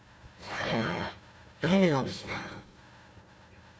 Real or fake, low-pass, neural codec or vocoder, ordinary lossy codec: fake; none; codec, 16 kHz, 1 kbps, FunCodec, trained on Chinese and English, 50 frames a second; none